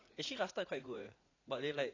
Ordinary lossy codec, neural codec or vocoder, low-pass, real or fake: AAC, 32 kbps; vocoder, 44.1 kHz, 80 mel bands, Vocos; 7.2 kHz; fake